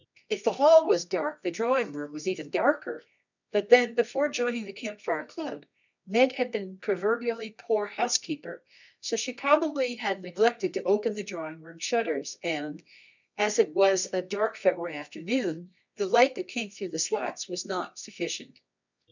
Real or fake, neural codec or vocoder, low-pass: fake; codec, 24 kHz, 0.9 kbps, WavTokenizer, medium music audio release; 7.2 kHz